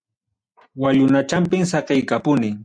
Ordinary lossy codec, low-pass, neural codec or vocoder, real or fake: MP3, 96 kbps; 9.9 kHz; none; real